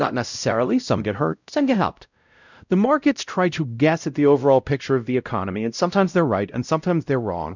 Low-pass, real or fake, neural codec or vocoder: 7.2 kHz; fake; codec, 16 kHz, 0.5 kbps, X-Codec, WavLM features, trained on Multilingual LibriSpeech